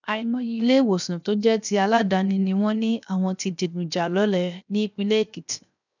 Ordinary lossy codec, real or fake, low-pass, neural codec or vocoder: none; fake; 7.2 kHz; codec, 16 kHz, 0.7 kbps, FocalCodec